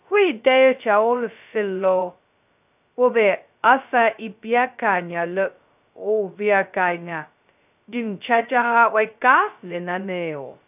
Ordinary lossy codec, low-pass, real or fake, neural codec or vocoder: none; 3.6 kHz; fake; codec, 16 kHz, 0.2 kbps, FocalCodec